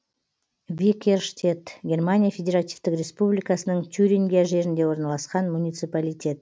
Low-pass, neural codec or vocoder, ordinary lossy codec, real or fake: none; none; none; real